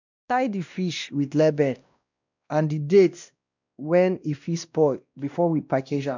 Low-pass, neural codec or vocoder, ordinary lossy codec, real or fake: 7.2 kHz; codec, 16 kHz, 1 kbps, X-Codec, WavLM features, trained on Multilingual LibriSpeech; none; fake